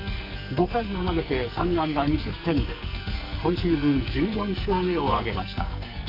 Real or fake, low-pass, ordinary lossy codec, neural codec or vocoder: fake; 5.4 kHz; none; codec, 44.1 kHz, 2.6 kbps, SNAC